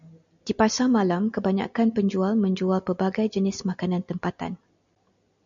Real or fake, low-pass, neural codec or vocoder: real; 7.2 kHz; none